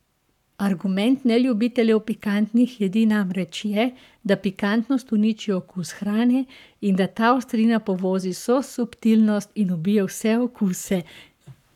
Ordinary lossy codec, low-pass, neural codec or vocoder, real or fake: none; 19.8 kHz; codec, 44.1 kHz, 7.8 kbps, Pupu-Codec; fake